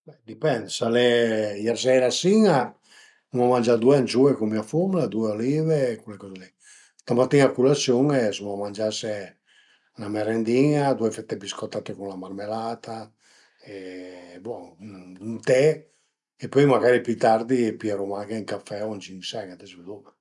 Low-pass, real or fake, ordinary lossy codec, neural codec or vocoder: 10.8 kHz; real; none; none